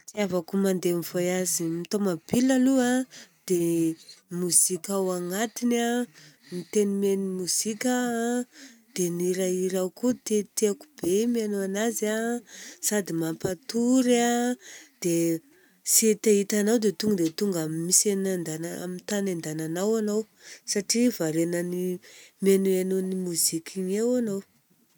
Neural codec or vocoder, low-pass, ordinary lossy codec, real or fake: none; none; none; real